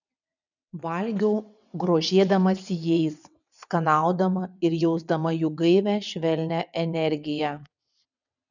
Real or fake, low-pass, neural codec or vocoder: fake; 7.2 kHz; vocoder, 22.05 kHz, 80 mel bands, WaveNeXt